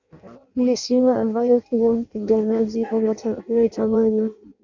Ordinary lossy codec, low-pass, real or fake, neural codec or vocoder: AAC, 48 kbps; 7.2 kHz; fake; codec, 16 kHz in and 24 kHz out, 0.6 kbps, FireRedTTS-2 codec